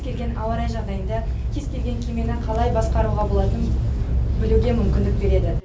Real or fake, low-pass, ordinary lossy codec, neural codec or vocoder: real; none; none; none